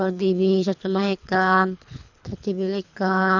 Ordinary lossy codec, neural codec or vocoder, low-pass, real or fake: none; codec, 24 kHz, 3 kbps, HILCodec; 7.2 kHz; fake